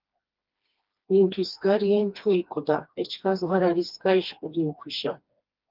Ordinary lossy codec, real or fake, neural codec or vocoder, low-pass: Opus, 32 kbps; fake; codec, 16 kHz, 2 kbps, FreqCodec, smaller model; 5.4 kHz